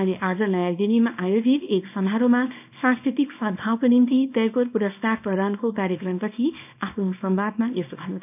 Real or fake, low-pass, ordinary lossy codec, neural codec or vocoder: fake; 3.6 kHz; none; codec, 24 kHz, 0.9 kbps, WavTokenizer, small release